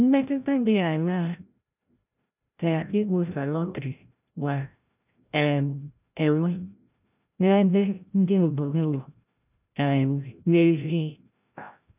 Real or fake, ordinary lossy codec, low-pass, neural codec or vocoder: fake; none; 3.6 kHz; codec, 16 kHz, 0.5 kbps, FreqCodec, larger model